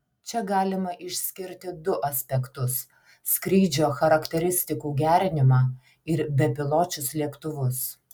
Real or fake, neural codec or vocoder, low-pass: real; none; 19.8 kHz